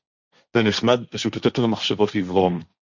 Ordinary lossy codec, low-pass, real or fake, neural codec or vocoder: Opus, 64 kbps; 7.2 kHz; fake; codec, 16 kHz, 1.1 kbps, Voila-Tokenizer